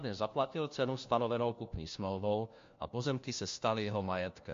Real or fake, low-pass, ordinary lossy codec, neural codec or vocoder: fake; 7.2 kHz; MP3, 48 kbps; codec, 16 kHz, 1 kbps, FunCodec, trained on LibriTTS, 50 frames a second